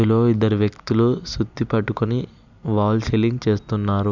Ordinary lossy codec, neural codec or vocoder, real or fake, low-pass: none; none; real; 7.2 kHz